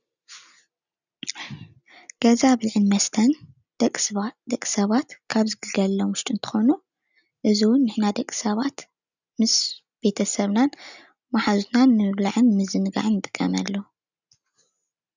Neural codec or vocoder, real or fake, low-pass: none; real; 7.2 kHz